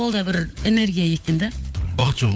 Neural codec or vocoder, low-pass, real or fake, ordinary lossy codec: codec, 16 kHz, 4 kbps, FunCodec, trained on LibriTTS, 50 frames a second; none; fake; none